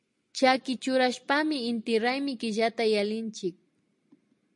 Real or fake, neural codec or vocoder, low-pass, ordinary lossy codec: real; none; 10.8 kHz; MP3, 48 kbps